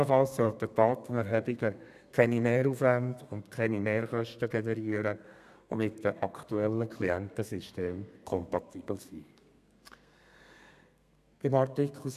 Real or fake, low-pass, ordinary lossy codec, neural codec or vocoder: fake; 14.4 kHz; none; codec, 32 kHz, 1.9 kbps, SNAC